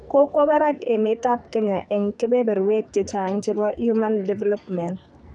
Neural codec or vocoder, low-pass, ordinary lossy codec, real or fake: codec, 44.1 kHz, 2.6 kbps, SNAC; 10.8 kHz; none; fake